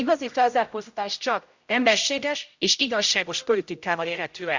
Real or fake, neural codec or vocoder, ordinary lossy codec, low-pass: fake; codec, 16 kHz, 0.5 kbps, X-Codec, HuBERT features, trained on general audio; Opus, 64 kbps; 7.2 kHz